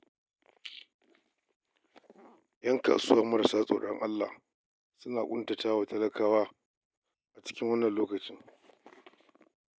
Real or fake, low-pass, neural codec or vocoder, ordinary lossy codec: real; none; none; none